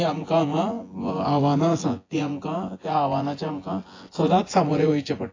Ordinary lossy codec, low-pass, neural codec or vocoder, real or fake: AAC, 32 kbps; 7.2 kHz; vocoder, 24 kHz, 100 mel bands, Vocos; fake